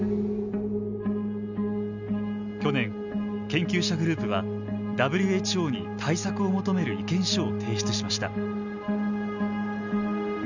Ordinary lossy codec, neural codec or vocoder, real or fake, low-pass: none; none; real; 7.2 kHz